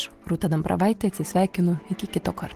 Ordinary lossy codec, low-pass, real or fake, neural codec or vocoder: Opus, 16 kbps; 14.4 kHz; real; none